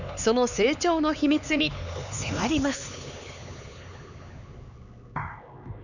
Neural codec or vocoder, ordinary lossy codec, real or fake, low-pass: codec, 16 kHz, 4 kbps, X-Codec, HuBERT features, trained on LibriSpeech; none; fake; 7.2 kHz